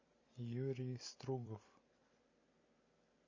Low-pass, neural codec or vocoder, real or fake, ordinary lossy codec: 7.2 kHz; vocoder, 44.1 kHz, 128 mel bands every 256 samples, BigVGAN v2; fake; MP3, 32 kbps